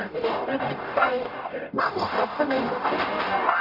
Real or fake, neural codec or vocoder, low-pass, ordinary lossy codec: fake; codec, 44.1 kHz, 0.9 kbps, DAC; 5.4 kHz; none